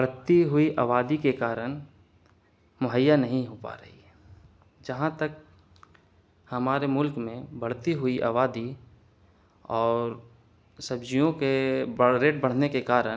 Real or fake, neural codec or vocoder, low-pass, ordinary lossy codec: real; none; none; none